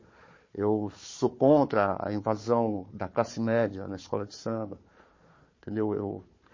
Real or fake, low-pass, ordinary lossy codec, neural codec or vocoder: fake; 7.2 kHz; MP3, 32 kbps; codec, 16 kHz, 4 kbps, FunCodec, trained on Chinese and English, 50 frames a second